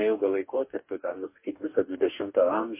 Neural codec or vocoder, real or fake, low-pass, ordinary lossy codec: codec, 44.1 kHz, 2.6 kbps, DAC; fake; 3.6 kHz; MP3, 24 kbps